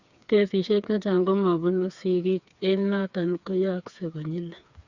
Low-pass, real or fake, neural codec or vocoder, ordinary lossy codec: 7.2 kHz; fake; codec, 16 kHz, 4 kbps, FreqCodec, smaller model; Opus, 64 kbps